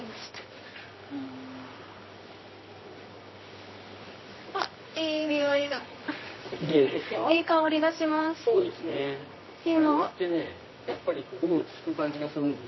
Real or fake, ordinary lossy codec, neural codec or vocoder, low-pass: fake; MP3, 24 kbps; codec, 24 kHz, 0.9 kbps, WavTokenizer, medium music audio release; 7.2 kHz